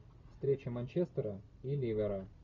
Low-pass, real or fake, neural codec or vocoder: 7.2 kHz; real; none